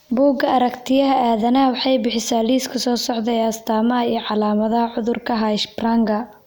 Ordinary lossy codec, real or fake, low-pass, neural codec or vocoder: none; real; none; none